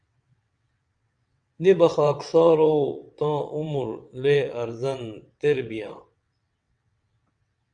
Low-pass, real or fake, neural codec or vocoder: 9.9 kHz; fake; vocoder, 22.05 kHz, 80 mel bands, WaveNeXt